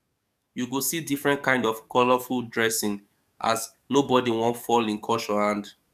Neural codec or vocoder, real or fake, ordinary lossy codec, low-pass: codec, 44.1 kHz, 7.8 kbps, DAC; fake; none; 14.4 kHz